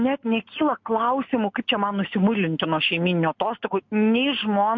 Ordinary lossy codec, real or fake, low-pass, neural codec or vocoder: MP3, 48 kbps; real; 7.2 kHz; none